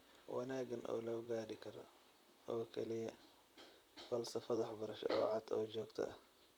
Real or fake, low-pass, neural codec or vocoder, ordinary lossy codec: fake; none; vocoder, 44.1 kHz, 128 mel bands, Pupu-Vocoder; none